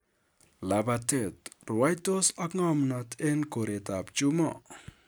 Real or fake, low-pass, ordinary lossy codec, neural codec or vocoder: real; none; none; none